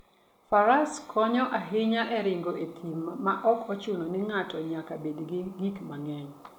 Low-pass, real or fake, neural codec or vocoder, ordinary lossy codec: 19.8 kHz; real; none; none